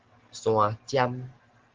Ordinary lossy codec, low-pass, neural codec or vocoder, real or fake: Opus, 16 kbps; 7.2 kHz; none; real